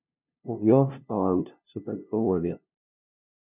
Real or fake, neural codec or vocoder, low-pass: fake; codec, 16 kHz, 0.5 kbps, FunCodec, trained on LibriTTS, 25 frames a second; 3.6 kHz